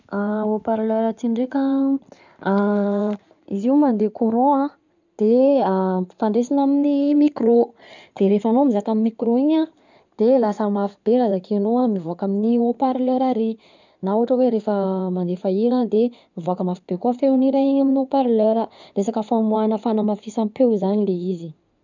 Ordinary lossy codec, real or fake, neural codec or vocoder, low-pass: none; fake; codec, 16 kHz in and 24 kHz out, 2.2 kbps, FireRedTTS-2 codec; 7.2 kHz